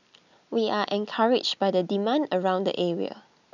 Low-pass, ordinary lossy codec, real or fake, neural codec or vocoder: 7.2 kHz; none; real; none